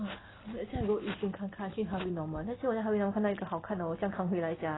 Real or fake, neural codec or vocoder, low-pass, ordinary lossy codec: real; none; 7.2 kHz; AAC, 16 kbps